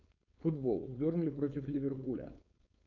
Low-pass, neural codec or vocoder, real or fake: 7.2 kHz; codec, 16 kHz, 4.8 kbps, FACodec; fake